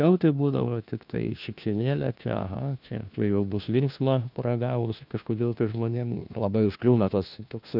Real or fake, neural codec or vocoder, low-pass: fake; codec, 16 kHz, 1 kbps, FunCodec, trained on LibriTTS, 50 frames a second; 5.4 kHz